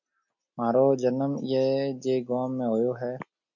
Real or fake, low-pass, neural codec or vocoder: real; 7.2 kHz; none